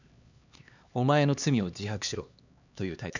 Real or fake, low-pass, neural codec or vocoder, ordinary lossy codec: fake; 7.2 kHz; codec, 16 kHz, 2 kbps, X-Codec, HuBERT features, trained on LibriSpeech; none